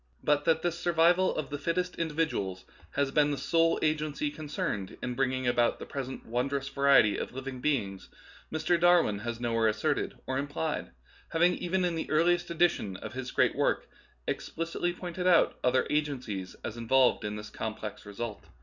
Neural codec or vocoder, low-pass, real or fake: none; 7.2 kHz; real